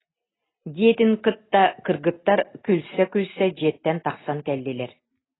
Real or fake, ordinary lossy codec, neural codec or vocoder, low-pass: real; AAC, 16 kbps; none; 7.2 kHz